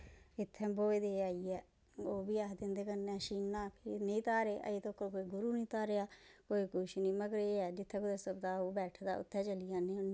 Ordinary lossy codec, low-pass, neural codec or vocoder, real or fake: none; none; none; real